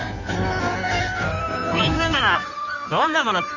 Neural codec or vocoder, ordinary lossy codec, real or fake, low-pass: codec, 16 kHz in and 24 kHz out, 1.1 kbps, FireRedTTS-2 codec; none; fake; 7.2 kHz